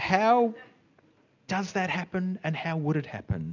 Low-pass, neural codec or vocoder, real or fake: 7.2 kHz; none; real